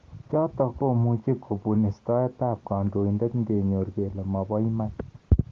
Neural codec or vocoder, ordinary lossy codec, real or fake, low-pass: none; Opus, 16 kbps; real; 7.2 kHz